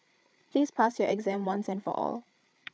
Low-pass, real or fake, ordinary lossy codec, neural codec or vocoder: none; fake; none; codec, 16 kHz, 8 kbps, FreqCodec, larger model